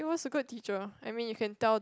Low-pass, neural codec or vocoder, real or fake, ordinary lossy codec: none; none; real; none